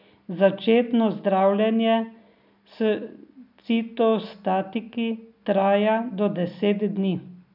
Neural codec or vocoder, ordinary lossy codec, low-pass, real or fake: none; none; 5.4 kHz; real